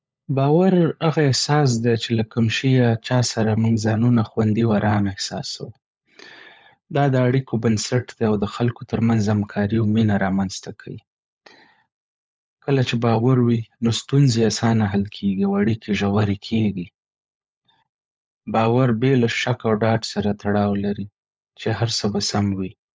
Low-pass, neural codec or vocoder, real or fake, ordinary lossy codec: none; codec, 16 kHz, 16 kbps, FunCodec, trained on LibriTTS, 50 frames a second; fake; none